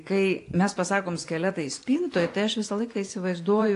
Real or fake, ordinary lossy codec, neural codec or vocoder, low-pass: fake; AAC, 48 kbps; vocoder, 24 kHz, 100 mel bands, Vocos; 10.8 kHz